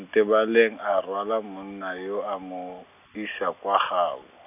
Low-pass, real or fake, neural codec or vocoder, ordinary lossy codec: 3.6 kHz; real; none; none